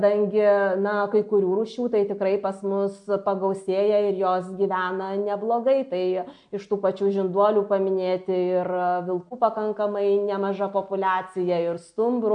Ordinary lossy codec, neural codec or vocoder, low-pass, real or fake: AAC, 64 kbps; none; 9.9 kHz; real